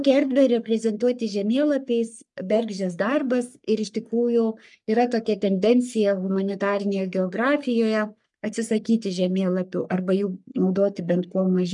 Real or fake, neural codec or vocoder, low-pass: fake; codec, 44.1 kHz, 3.4 kbps, Pupu-Codec; 10.8 kHz